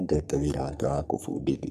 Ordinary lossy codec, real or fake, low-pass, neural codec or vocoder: none; fake; 14.4 kHz; codec, 44.1 kHz, 3.4 kbps, Pupu-Codec